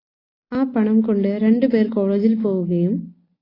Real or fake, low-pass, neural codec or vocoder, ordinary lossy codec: real; 5.4 kHz; none; AAC, 24 kbps